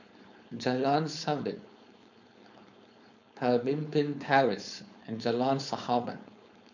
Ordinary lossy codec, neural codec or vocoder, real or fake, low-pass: none; codec, 16 kHz, 4.8 kbps, FACodec; fake; 7.2 kHz